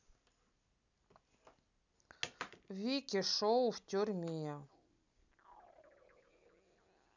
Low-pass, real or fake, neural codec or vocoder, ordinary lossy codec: 7.2 kHz; real; none; none